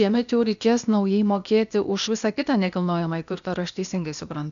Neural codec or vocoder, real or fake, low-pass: codec, 16 kHz, 0.8 kbps, ZipCodec; fake; 7.2 kHz